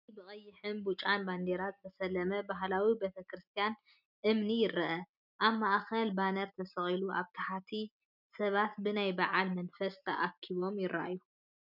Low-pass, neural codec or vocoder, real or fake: 5.4 kHz; none; real